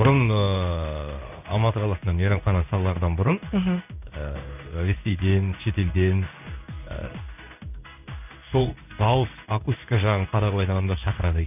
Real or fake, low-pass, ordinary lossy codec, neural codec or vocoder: fake; 3.6 kHz; none; codec, 16 kHz in and 24 kHz out, 1 kbps, XY-Tokenizer